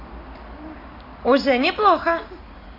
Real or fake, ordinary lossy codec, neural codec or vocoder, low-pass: real; AAC, 32 kbps; none; 5.4 kHz